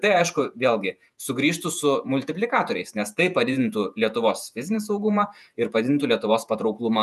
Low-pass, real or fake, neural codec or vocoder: 14.4 kHz; fake; vocoder, 48 kHz, 128 mel bands, Vocos